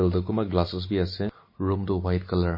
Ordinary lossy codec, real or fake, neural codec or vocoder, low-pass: MP3, 24 kbps; real; none; 5.4 kHz